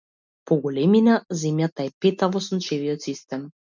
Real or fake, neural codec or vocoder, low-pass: real; none; 7.2 kHz